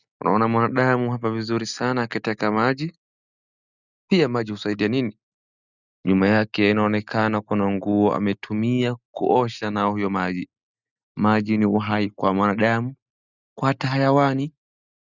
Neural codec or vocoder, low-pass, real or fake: none; 7.2 kHz; real